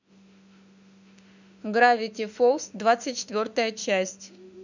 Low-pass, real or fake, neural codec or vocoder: 7.2 kHz; fake; autoencoder, 48 kHz, 32 numbers a frame, DAC-VAE, trained on Japanese speech